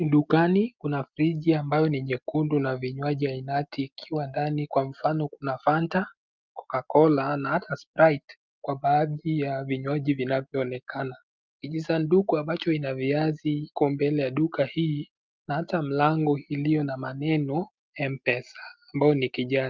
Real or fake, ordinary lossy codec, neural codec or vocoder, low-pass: real; Opus, 24 kbps; none; 7.2 kHz